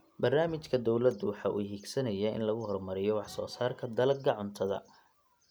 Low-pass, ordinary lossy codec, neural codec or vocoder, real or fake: none; none; none; real